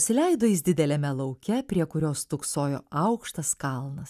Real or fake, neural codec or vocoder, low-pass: real; none; 14.4 kHz